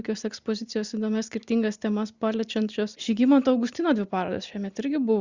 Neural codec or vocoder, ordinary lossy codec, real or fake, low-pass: none; Opus, 64 kbps; real; 7.2 kHz